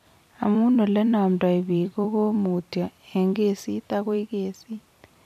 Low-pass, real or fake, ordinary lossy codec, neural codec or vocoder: 14.4 kHz; fake; none; vocoder, 44.1 kHz, 128 mel bands every 256 samples, BigVGAN v2